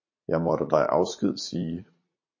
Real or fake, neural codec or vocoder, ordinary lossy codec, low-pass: fake; vocoder, 44.1 kHz, 80 mel bands, Vocos; MP3, 32 kbps; 7.2 kHz